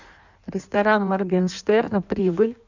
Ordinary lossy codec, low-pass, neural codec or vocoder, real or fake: none; 7.2 kHz; codec, 16 kHz in and 24 kHz out, 1.1 kbps, FireRedTTS-2 codec; fake